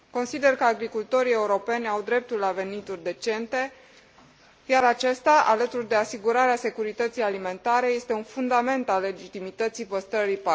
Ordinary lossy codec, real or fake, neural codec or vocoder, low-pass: none; real; none; none